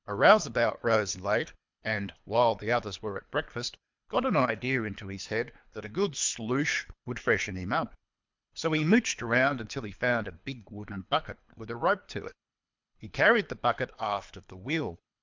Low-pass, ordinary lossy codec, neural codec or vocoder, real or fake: 7.2 kHz; MP3, 64 kbps; codec, 24 kHz, 3 kbps, HILCodec; fake